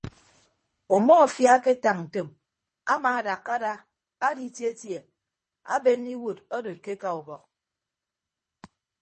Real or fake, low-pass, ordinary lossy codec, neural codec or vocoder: fake; 10.8 kHz; MP3, 32 kbps; codec, 24 kHz, 3 kbps, HILCodec